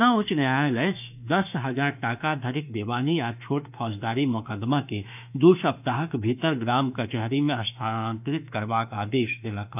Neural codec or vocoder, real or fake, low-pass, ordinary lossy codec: autoencoder, 48 kHz, 32 numbers a frame, DAC-VAE, trained on Japanese speech; fake; 3.6 kHz; none